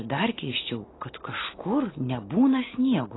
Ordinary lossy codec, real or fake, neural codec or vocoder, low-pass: AAC, 16 kbps; real; none; 7.2 kHz